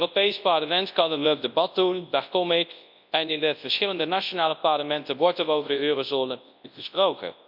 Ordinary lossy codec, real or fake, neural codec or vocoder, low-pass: MP3, 48 kbps; fake; codec, 24 kHz, 0.9 kbps, WavTokenizer, large speech release; 5.4 kHz